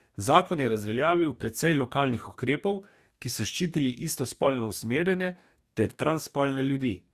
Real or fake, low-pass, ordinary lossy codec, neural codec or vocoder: fake; 14.4 kHz; Opus, 64 kbps; codec, 44.1 kHz, 2.6 kbps, DAC